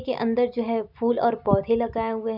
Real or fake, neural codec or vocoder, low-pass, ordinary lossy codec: real; none; 5.4 kHz; none